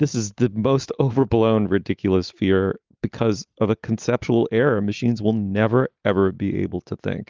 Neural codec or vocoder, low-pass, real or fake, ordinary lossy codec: none; 7.2 kHz; real; Opus, 32 kbps